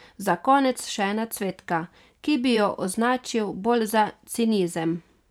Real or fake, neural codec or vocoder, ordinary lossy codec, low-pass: fake; vocoder, 44.1 kHz, 128 mel bands every 512 samples, BigVGAN v2; none; 19.8 kHz